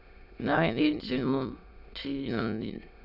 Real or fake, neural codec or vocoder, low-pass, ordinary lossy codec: fake; autoencoder, 22.05 kHz, a latent of 192 numbers a frame, VITS, trained on many speakers; 5.4 kHz; none